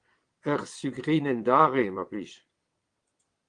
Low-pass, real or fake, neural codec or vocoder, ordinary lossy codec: 9.9 kHz; fake; vocoder, 22.05 kHz, 80 mel bands, WaveNeXt; Opus, 24 kbps